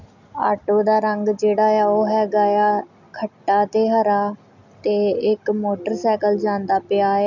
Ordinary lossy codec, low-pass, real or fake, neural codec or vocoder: none; 7.2 kHz; real; none